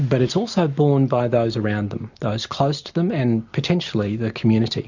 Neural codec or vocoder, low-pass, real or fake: none; 7.2 kHz; real